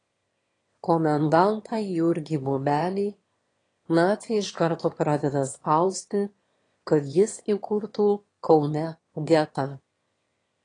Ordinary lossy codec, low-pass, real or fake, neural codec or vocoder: AAC, 32 kbps; 9.9 kHz; fake; autoencoder, 22.05 kHz, a latent of 192 numbers a frame, VITS, trained on one speaker